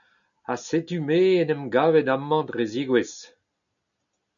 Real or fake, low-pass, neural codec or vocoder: real; 7.2 kHz; none